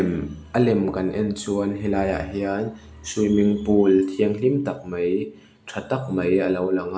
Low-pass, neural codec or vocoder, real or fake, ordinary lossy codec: none; none; real; none